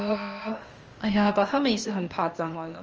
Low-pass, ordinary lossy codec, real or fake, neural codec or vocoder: 7.2 kHz; Opus, 24 kbps; fake; codec, 16 kHz, 0.8 kbps, ZipCodec